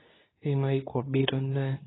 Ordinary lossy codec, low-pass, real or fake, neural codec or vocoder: AAC, 16 kbps; 7.2 kHz; fake; codec, 24 kHz, 0.9 kbps, WavTokenizer, medium speech release version 2